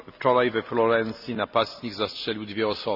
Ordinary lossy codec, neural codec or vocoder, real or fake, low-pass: Opus, 64 kbps; none; real; 5.4 kHz